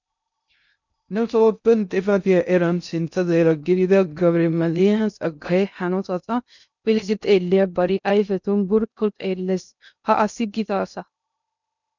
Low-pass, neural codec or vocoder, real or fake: 7.2 kHz; codec, 16 kHz in and 24 kHz out, 0.6 kbps, FocalCodec, streaming, 2048 codes; fake